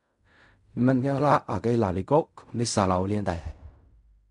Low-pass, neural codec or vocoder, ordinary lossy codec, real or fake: 10.8 kHz; codec, 16 kHz in and 24 kHz out, 0.4 kbps, LongCat-Audio-Codec, fine tuned four codebook decoder; MP3, 96 kbps; fake